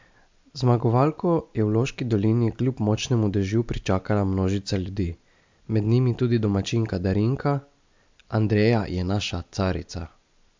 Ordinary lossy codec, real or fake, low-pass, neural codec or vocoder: MP3, 64 kbps; real; 7.2 kHz; none